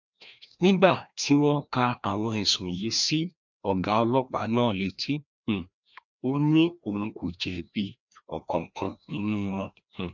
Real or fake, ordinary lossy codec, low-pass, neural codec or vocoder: fake; none; 7.2 kHz; codec, 16 kHz, 1 kbps, FreqCodec, larger model